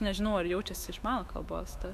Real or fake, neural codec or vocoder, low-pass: fake; autoencoder, 48 kHz, 128 numbers a frame, DAC-VAE, trained on Japanese speech; 14.4 kHz